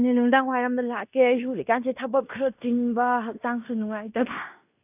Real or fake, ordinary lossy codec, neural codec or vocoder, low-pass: fake; none; codec, 16 kHz in and 24 kHz out, 0.9 kbps, LongCat-Audio-Codec, fine tuned four codebook decoder; 3.6 kHz